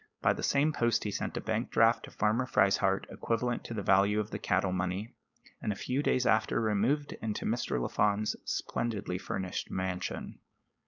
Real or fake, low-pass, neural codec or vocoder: fake; 7.2 kHz; codec, 16 kHz, 4.8 kbps, FACodec